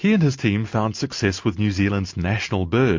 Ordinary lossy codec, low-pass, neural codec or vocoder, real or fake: MP3, 48 kbps; 7.2 kHz; none; real